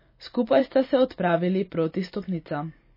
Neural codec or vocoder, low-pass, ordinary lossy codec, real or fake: vocoder, 44.1 kHz, 128 mel bands every 512 samples, BigVGAN v2; 5.4 kHz; MP3, 24 kbps; fake